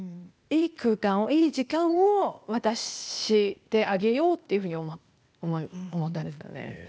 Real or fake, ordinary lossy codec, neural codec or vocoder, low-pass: fake; none; codec, 16 kHz, 0.8 kbps, ZipCodec; none